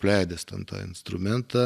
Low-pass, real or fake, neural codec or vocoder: 14.4 kHz; real; none